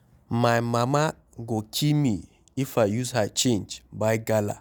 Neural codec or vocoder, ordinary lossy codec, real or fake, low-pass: none; none; real; none